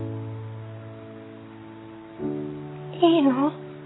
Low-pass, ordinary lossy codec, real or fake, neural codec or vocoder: 7.2 kHz; AAC, 16 kbps; real; none